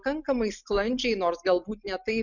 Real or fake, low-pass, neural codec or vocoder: real; 7.2 kHz; none